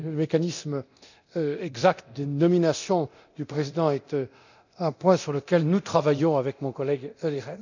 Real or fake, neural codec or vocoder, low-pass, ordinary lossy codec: fake; codec, 24 kHz, 0.9 kbps, DualCodec; 7.2 kHz; none